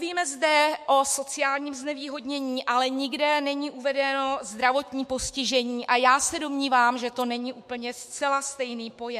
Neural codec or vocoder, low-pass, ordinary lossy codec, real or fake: autoencoder, 48 kHz, 128 numbers a frame, DAC-VAE, trained on Japanese speech; 14.4 kHz; MP3, 64 kbps; fake